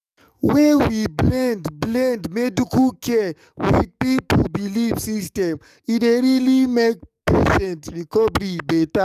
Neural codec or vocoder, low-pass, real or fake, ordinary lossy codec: codec, 44.1 kHz, 7.8 kbps, DAC; 14.4 kHz; fake; none